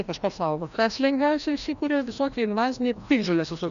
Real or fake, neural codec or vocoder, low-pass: fake; codec, 16 kHz, 1 kbps, FreqCodec, larger model; 7.2 kHz